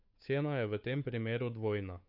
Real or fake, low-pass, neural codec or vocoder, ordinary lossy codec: fake; 5.4 kHz; codec, 16 kHz, 8 kbps, FunCodec, trained on Chinese and English, 25 frames a second; none